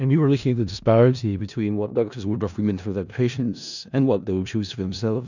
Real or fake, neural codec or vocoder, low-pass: fake; codec, 16 kHz in and 24 kHz out, 0.4 kbps, LongCat-Audio-Codec, four codebook decoder; 7.2 kHz